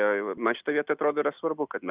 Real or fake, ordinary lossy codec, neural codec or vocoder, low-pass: real; Opus, 64 kbps; none; 3.6 kHz